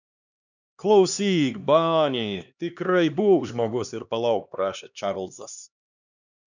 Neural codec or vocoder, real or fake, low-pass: codec, 16 kHz, 2 kbps, X-Codec, HuBERT features, trained on LibriSpeech; fake; 7.2 kHz